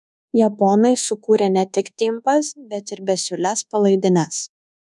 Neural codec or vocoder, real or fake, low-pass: codec, 24 kHz, 1.2 kbps, DualCodec; fake; 10.8 kHz